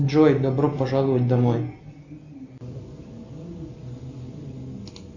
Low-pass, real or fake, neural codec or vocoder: 7.2 kHz; real; none